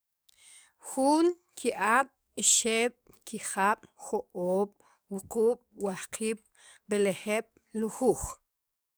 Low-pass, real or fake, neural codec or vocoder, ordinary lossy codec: none; fake; codec, 44.1 kHz, 7.8 kbps, DAC; none